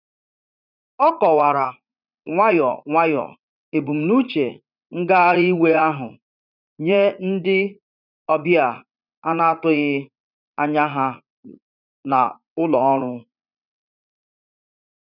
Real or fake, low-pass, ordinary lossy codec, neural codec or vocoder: fake; 5.4 kHz; none; vocoder, 44.1 kHz, 80 mel bands, Vocos